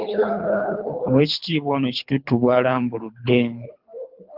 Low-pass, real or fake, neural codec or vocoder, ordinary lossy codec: 5.4 kHz; fake; codec, 24 kHz, 3 kbps, HILCodec; Opus, 24 kbps